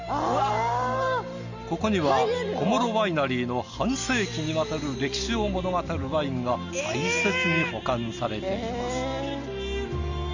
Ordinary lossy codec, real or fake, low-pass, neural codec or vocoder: Opus, 64 kbps; real; 7.2 kHz; none